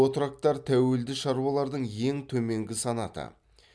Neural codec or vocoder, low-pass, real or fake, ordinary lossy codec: none; none; real; none